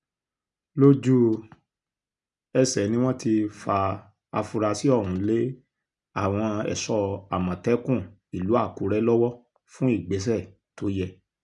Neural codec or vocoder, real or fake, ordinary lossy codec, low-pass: none; real; none; 10.8 kHz